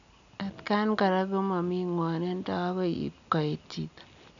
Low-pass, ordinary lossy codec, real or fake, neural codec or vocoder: 7.2 kHz; none; real; none